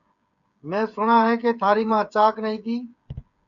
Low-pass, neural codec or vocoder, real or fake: 7.2 kHz; codec, 16 kHz, 8 kbps, FreqCodec, smaller model; fake